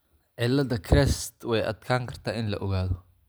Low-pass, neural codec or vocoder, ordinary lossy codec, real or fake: none; none; none; real